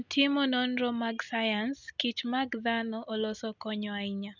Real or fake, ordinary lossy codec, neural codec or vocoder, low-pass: real; none; none; 7.2 kHz